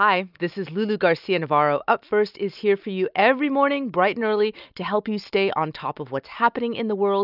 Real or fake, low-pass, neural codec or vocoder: real; 5.4 kHz; none